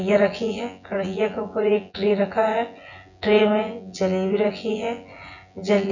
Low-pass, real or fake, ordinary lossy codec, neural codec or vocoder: 7.2 kHz; fake; none; vocoder, 24 kHz, 100 mel bands, Vocos